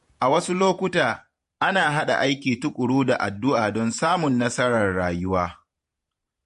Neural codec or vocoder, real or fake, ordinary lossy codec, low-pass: vocoder, 48 kHz, 128 mel bands, Vocos; fake; MP3, 48 kbps; 14.4 kHz